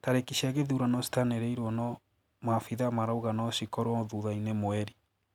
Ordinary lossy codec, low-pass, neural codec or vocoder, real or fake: none; 19.8 kHz; none; real